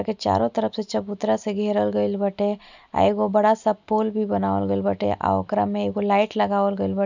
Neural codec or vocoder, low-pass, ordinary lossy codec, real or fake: none; 7.2 kHz; none; real